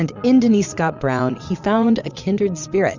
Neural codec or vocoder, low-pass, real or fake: vocoder, 22.05 kHz, 80 mel bands, Vocos; 7.2 kHz; fake